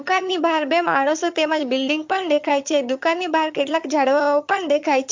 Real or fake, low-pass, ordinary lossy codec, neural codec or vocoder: fake; 7.2 kHz; MP3, 48 kbps; vocoder, 22.05 kHz, 80 mel bands, HiFi-GAN